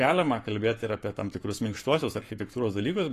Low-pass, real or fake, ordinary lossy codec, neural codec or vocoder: 14.4 kHz; fake; AAC, 48 kbps; codec, 44.1 kHz, 7.8 kbps, Pupu-Codec